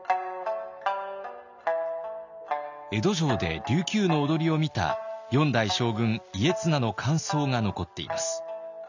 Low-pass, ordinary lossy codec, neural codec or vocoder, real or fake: 7.2 kHz; none; none; real